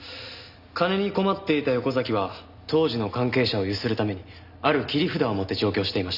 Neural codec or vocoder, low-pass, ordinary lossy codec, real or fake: none; 5.4 kHz; none; real